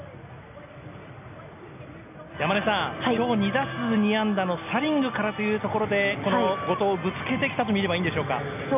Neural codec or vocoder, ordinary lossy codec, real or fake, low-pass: none; none; real; 3.6 kHz